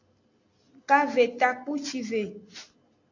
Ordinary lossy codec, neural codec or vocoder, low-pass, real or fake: AAC, 48 kbps; none; 7.2 kHz; real